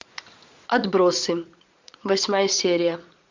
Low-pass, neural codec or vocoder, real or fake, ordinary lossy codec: 7.2 kHz; vocoder, 44.1 kHz, 80 mel bands, Vocos; fake; MP3, 64 kbps